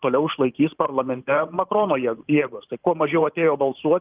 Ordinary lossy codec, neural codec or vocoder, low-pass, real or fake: Opus, 32 kbps; vocoder, 44.1 kHz, 128 mel bands every 512 samples, BigVGAN v2; 3.6 kHz; fake